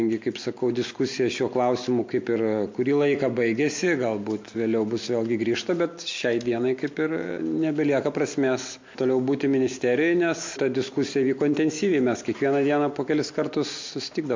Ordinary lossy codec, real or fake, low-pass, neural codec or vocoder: MP3, 48 kbps; real; 7.2 kHz; none